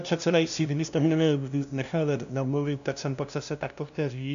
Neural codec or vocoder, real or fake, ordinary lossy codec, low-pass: codec, 16 kHz, 0.5 kbps, FunCodec, trained on LibriTTS, 25 frames a second; fake; AAC, 96 kbps; 7.2 kHz